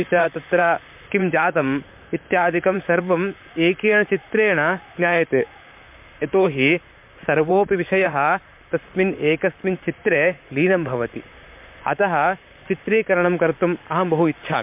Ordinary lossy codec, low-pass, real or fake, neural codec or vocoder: MP3, 32 kbps; 3.6 kHz; fake; vocoder, 44.1 kHz, 128 mel bands, Pupu-Vocoder